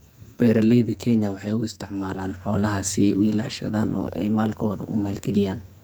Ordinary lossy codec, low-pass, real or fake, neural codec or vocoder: none; none; fake; codec, 44.1 kHz, 2.6 kbps, SNAC